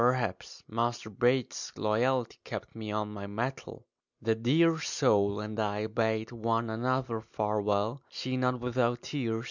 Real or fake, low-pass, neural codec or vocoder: real; 7.2 kHz; none